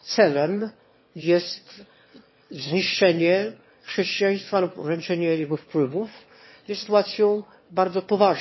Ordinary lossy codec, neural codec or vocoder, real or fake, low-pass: MP3, 24 kbps; autoencoder, 22.05 kHz, a latent of 192 numbers a frame, VITS, trained on one speaker; fake; 7.2 kHz